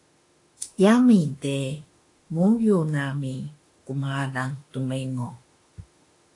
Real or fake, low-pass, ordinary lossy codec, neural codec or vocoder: fake; 10.8 kHz; AAC, 48 kbps; autoencoder, 48 kHz, 32 numbers a frame, DAC-VAE, trained on Japanese speech